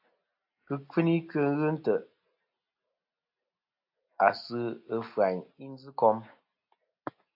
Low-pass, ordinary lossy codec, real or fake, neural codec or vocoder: 5.4 kHz; AAC, 48 kbps; real; none